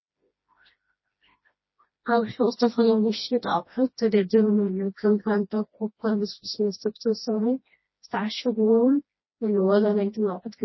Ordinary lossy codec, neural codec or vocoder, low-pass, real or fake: MP3, 24 kbps; codec, 16 kHz, 1 kbps, FreqCodec, smaller model; 7.2 kHz; fake